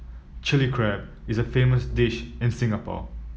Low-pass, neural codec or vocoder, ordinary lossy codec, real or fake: none; none; none; real